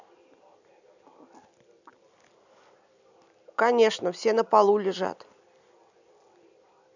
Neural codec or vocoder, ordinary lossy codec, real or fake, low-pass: none; none; real; 7.2 kHz